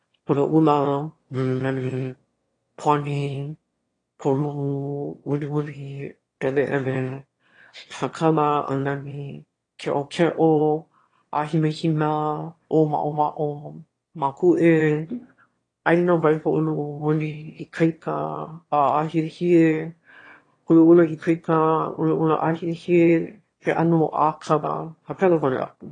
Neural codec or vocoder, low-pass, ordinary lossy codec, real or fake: autoencoder, 22.05 kHz, a latent of 192 numbers a frame, VITS, trained on one speaker; 9.9 kHz; AAC, 32 kbps; fake